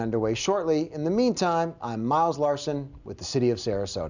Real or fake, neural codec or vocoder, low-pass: real; none; 7.2 kHz